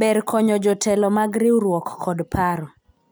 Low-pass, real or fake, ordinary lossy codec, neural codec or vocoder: none; real; none; none